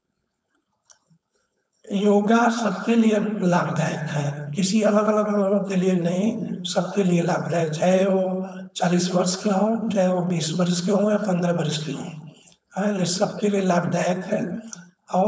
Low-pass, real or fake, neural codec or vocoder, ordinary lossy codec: none; fake; codec, 16 kHz, 4.8 kbps, FACodec; none